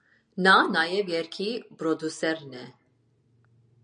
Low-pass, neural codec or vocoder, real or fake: 9.9 kHz; none; real